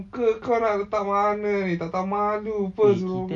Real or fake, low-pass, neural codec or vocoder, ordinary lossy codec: real; 9.9 kHz; none; MP3, 48 kbps